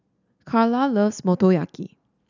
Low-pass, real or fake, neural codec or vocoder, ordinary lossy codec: 7.2 kHz; real; none; none